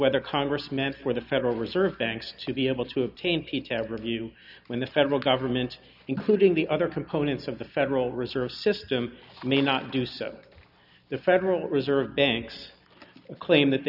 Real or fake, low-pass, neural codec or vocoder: real; 5.4 kHz; none